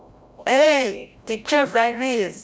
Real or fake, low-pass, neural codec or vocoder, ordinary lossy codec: fake; none; codec, 16 kHz, 0.5 kbps, FreqCodec, larger model; none